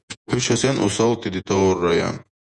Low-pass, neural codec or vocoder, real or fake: 10.8 kHz; vocoder, 48 kHz, 128 mel bands, Vocos; fake